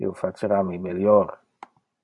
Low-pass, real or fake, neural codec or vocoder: 10.8 kHz; fake; vocoder, 44.1 kHz, 128 mel bands every 512 samples, BigVGAN v2